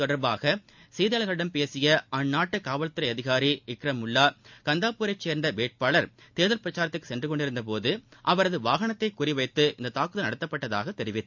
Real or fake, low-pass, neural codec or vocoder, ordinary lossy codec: real; 7.2 kHz; none; MP3, 64 kbps